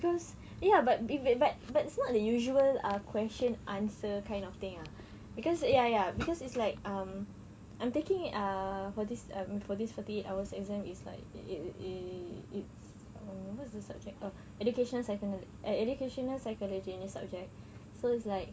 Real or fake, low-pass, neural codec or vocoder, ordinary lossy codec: real; none; none; none